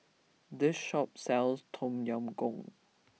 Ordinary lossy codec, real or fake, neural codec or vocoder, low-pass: none; real; none; none